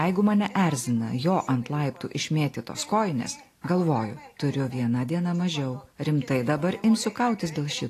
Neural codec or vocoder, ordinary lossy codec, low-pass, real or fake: none; AAC, 48 kbps; 14.4 kHz; real